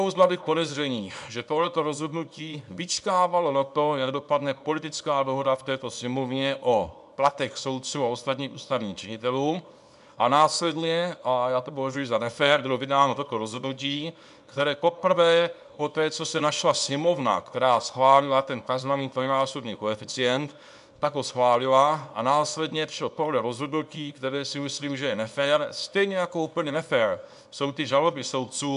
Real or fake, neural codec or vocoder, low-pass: fake; codec, 24 kHz, 0.9 kbps, WavTokenizer, small release; 10.8 kHz